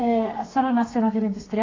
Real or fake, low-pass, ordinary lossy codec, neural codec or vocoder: fake; 7.2 kHz; AAC, 32 kbps; codec, 32 kHz, 1.9 kbps, SNAC